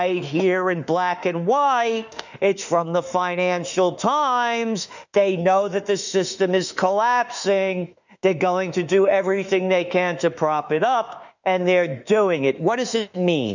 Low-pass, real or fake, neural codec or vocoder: 7.2 kHz; fake; autoencoder, 48 kHz, 32 numbers a frame, DAC-VAE, trained on Japanese speech